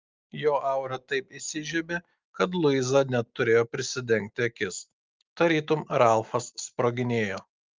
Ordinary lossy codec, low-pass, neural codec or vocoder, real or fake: Opus, 24 kbps; 7.2 kHz; none; real